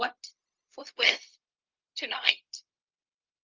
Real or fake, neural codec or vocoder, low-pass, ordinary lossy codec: fake; codec, 16 kHz, 0.4 kbps, LongCat-Audio-Codec; 7.2 kHz; Opus, 32 kbps